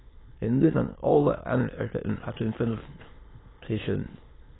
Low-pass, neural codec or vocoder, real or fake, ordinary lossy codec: 7.2 kHz; autoencoder, 22.05 kHz, a latent of 192 numbers a frame, VITS, trained on many speakers; fake; AAC, 16 kbps